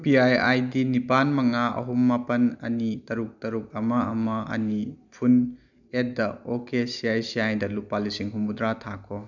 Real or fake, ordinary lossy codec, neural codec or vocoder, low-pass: real; none; none; 7.2 kHz